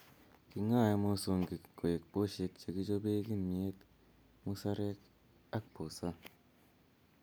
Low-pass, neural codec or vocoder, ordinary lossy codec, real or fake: none; none; none; real